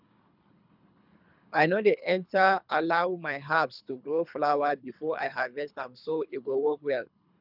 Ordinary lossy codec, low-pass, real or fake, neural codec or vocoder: none; 5.4 kHz; fake; codec, 24 kHz, 3 kbps, HILCodec